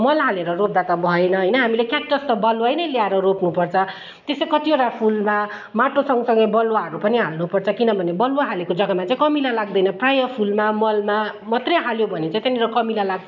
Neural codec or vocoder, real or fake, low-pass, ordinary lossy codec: none; real; 7.2 kHz; none